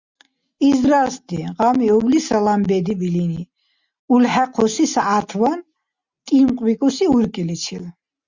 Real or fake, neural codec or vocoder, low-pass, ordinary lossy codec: real; none; 7.2 kHz; Opus, 64 kbps